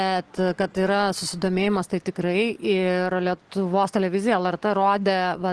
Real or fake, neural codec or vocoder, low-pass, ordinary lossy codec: real; none; 10.8 kHz; Opus, 16 kbps